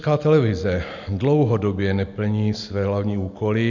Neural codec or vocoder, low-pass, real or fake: none; 7.2 kHz; real